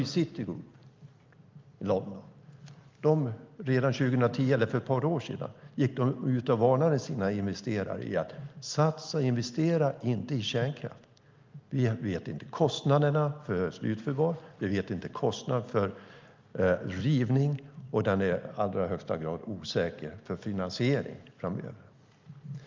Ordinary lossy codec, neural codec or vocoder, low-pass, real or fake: Opus, 24 kbps; none; 7.2 kHz; real